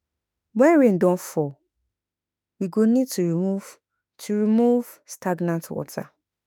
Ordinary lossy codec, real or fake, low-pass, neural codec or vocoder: none; fake; none; autoencoder, 48 kHz, 32 numbers a frame, DAC-VAE, trained on Japanese speech